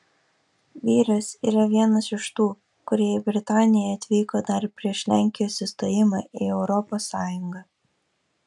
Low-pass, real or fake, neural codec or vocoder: 10.8 kHz; real; none